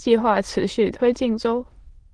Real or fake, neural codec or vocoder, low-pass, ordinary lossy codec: fake; autoencoder, 22.05 kHz, a latent of 192 numbers a frame, VITS, trained on many speakers; 9.9 kHz; Opus, 16 kbps